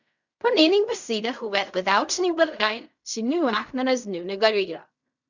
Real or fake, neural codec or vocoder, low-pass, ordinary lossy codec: fake; codec, 16 kHz in and 24 kHz out, 0.4 kbps, LongCat-Audio-Codec, fine tuned four codebook decoder; 7.2 kHz; none